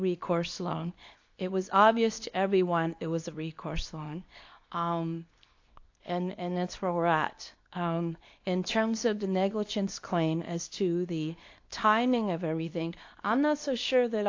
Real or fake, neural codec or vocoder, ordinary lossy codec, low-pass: fake; codec, 24 kHz, 0.9 kbps, WavTokenizer, medium speech release version 2; AAC, 48 kbps; 7.2 kHz